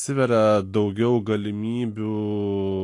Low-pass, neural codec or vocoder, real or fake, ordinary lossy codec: 10.8 kHz; none; real; AAC, 48 kbps